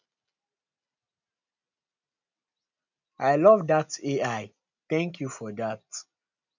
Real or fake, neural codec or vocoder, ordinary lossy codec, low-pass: real; none; none; 7.2 kHz